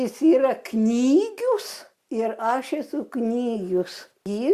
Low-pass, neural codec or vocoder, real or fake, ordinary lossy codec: 14.4 kHz; none; real; Opus, 64 kbps